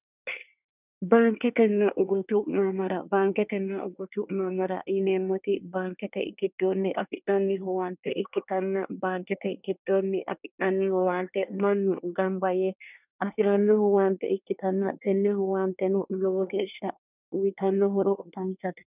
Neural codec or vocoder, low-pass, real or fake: codec, 24 kHz, 1 kbps, SNAC; 3.6 kHz; fake